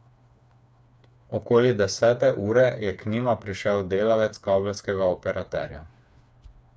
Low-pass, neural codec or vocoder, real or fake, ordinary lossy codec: none; codec, 16 kHz, 4 kbps, FreqCodec, smaller model; fake; none